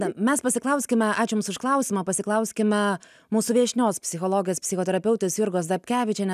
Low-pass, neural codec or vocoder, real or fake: 14.4 kHz; none; real